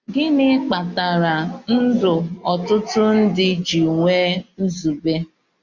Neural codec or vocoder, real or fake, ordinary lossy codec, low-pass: none; real; Opus, 64 kbps; 7.2 kHz